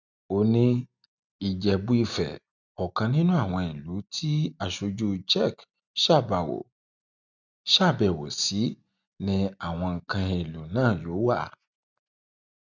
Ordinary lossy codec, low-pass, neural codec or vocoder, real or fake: none; 7.2 kHz; none; real